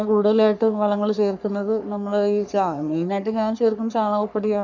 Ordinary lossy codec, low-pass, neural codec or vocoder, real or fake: none; 7.2 kHz; codec, 44.1 kHz, 3.4 kbps, Pupu-Codec; fake